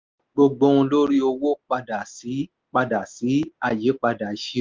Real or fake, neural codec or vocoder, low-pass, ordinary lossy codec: real; none; 7.2 kHz; Opus, 32 kbps